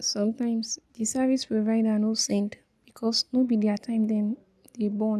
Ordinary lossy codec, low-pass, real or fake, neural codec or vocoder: none; none; real; none